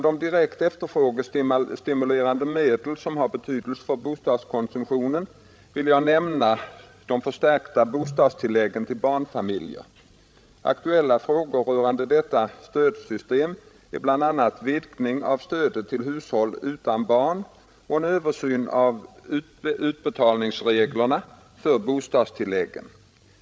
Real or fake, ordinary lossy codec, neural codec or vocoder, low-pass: fake; none; codec, 16 kHz, 16 kbps, FreqCodec, larger model; none